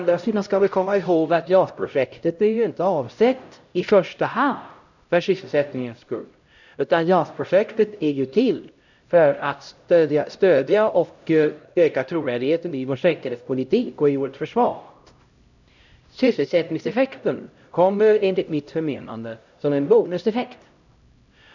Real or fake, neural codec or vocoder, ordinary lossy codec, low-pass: fake; codec, 16 kHz, 0.5 kbps, X-Codec, HuBERT features, trained on LibriSpeech; none; 7.2 kHz